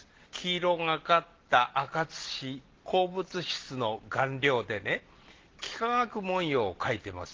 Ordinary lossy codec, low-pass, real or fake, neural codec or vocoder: Opus, 16 kbps; 7.2 kHz; real; none